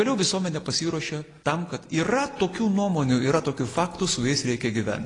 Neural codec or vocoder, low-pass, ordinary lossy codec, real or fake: none; 10.8 kHz; AAC, 32 kbps; real